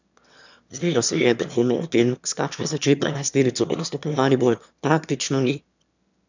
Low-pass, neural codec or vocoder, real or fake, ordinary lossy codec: 7.2 kHz; autoencoder, 22.05 kHz, a latent of 192 numbers a frame, VITS, trained on one speaker; fake; none